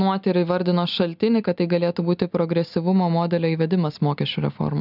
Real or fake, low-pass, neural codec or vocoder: real; 5.4 kHz; none